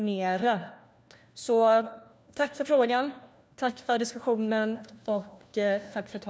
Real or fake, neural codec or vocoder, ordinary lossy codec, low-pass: fake; codec, 16 kHz, 1 kbps, FunCodec, trained on LibriTTS, 50 frames a second; none; none